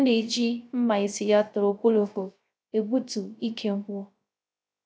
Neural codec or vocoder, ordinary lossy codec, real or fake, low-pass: codec, 16 kHz, 0.3 kbps, FocalCodec; none; fake; none